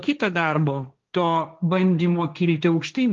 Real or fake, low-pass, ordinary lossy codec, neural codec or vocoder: fake; 7.2 kHz; Opus, 32 kbps; codec, 16 kHz, 1.1 kbps, Voila-Tokenizer